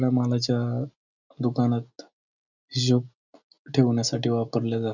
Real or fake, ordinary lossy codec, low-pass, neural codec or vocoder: real; none; 7.2 kHz; none